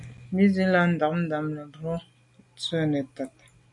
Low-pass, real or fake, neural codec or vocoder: 10.8 kHz; real; none